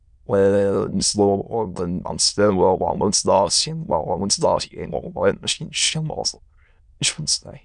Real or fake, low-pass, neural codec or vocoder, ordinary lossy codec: fake; 9.9 kHz; autoencoder, 22.05 kHz, a latent of 192 numbers a frame, VITS, trained on many speakers; none